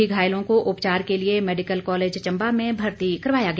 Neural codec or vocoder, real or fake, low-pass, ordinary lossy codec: none; real; none; none